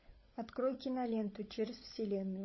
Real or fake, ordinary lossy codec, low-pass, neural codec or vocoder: fake; MP3, 24 kbps; 7.2 kHz; codec, 16 kHz, 16 kbps, FunCodec, trained on LibriTTS, 50 frames a second